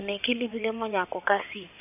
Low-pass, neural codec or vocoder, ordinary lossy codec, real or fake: 3.6 kHz; codec, 16 kHz in and 24 kHz out, 2.2 kbps, FireRedTTS-2 codec; none; fake